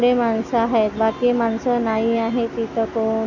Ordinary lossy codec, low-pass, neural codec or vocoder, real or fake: none; 7.2 kHz; none; real